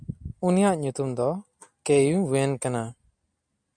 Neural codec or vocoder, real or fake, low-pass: none; real; 9.9 kHz